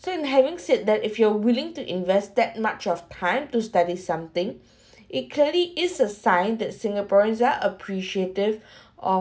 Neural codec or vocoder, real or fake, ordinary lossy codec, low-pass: none; real; none; none